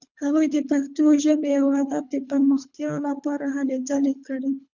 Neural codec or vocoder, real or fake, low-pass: codec, 24 kHz, 3 kbps, HILCodec; fake; 7.2 kHz